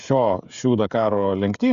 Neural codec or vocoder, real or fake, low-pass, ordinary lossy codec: codec, 16 kHz, 16 kbps, FreqCodec, smaller model; fake; 7.2 kHz; Opus, 64 kbps